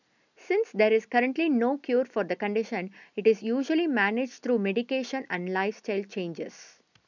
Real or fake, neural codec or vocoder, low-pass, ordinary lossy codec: real; none; 7.2 kHz; none